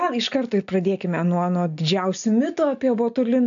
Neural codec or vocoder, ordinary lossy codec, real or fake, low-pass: none; AAC, 64 kbps; real; 7.2 kHz